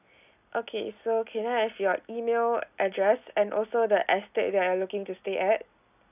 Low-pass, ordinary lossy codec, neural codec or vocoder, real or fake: 3.6 kHz; none; none; real